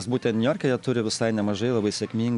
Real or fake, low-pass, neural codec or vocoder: real; 10.8 kHz; none